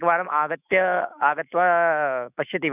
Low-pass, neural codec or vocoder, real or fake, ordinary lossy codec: 3.6 kHz; autoencoder, 48 kHz, 128 numbers a frame, DAC-VAE, trained on Japanese speech; fake; none